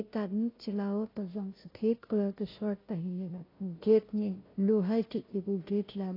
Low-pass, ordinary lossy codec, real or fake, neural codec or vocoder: 5.4 kHz; AAC, 24 kbps; fake; codec, 16 kHz, 0.5 kbps, FunCodec, trained on Chinese and English, 25 frames a second